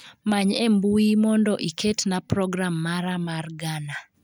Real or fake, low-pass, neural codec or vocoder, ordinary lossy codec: real; 19.8 kHz; none; none